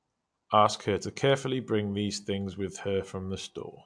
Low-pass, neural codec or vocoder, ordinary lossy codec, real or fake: 9.9 kHz; none; MP3, 64 kbps; real